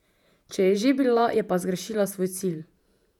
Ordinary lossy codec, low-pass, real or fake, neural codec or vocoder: none; 19.8 kHz; fake; vocoder, 48 kHz, 128 mel bands, Vocos